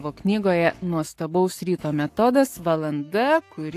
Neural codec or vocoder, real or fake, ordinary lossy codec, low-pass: codec, 44.1 kHz, 7.8 kbps, Pupu-Codec; fake; AAC, 64 kbps; 14.4 kHz